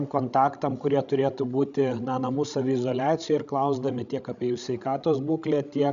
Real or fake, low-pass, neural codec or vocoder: fake; 7.2 kHz; codec, 16 kHz, 16 kbps, FreqCodec, larger model